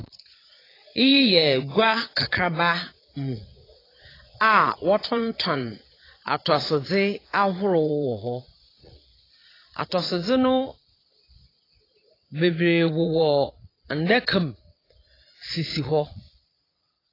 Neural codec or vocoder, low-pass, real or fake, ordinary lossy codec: vocoder, 22.05 kHz, 80 mel bands, Vocos; 5.4 kHz; fake; AAC, 24 kbps